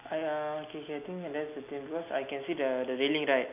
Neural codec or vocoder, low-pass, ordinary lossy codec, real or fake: none; 3.6 kHz; none; real